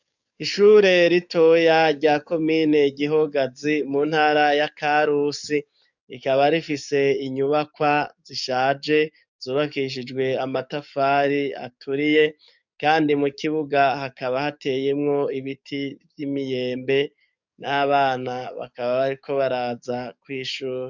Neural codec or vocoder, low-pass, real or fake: codec, 44.1 kHz, 7.8 kbps, DAC; 7.2 kHz; fake